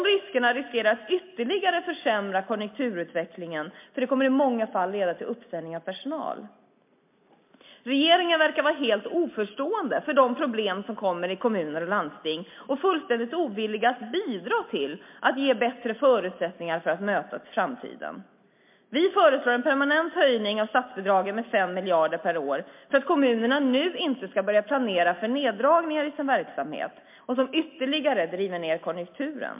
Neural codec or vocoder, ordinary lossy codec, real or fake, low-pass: none; MP3, 32 kbps; real; 3.6 kHz